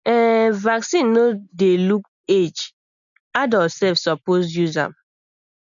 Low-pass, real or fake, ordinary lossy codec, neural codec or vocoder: 7.2 kHz; real; none; none